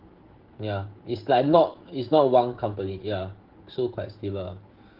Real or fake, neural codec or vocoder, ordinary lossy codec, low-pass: fake; codec, 16 kHz, 16 kbps, FreqCodec, smaller model; Opus, 16 kbps; 5.4 kHz